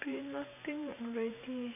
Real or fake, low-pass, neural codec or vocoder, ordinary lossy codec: fake; 3.6 kHz; vocoder, 44.1 kHz, 128 mel bands every 512 samples, BigVGAN v2; none